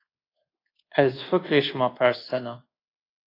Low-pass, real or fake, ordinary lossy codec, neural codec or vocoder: 5.4 kHz; fake; AAC, 24 kbps; codec, 24 kHz, 1.2 kbps, DualCodec